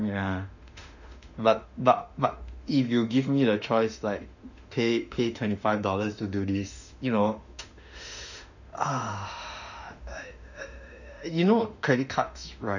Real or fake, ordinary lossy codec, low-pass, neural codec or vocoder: fake; none; 7.2 kHz; autoencoder, 48 kHz, 32 numbers a frame, DAC-VAE, trained on Japanese speech